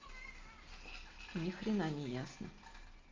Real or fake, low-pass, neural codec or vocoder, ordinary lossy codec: real; 7.2 kHz; none; Opus, 24 kbps